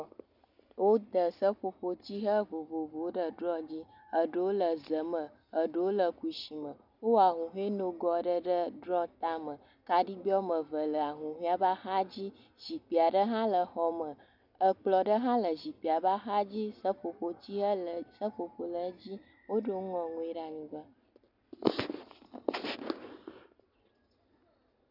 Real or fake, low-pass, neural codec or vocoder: real; 5.4 kHz; none